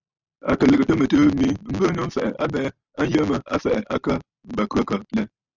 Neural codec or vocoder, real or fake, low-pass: none; real; 7.2 kHz